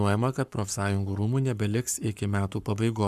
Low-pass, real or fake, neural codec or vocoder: 14.4 kHz; fake; codec, 44.1 kHz, 7.8 kbps, Pupu-Codec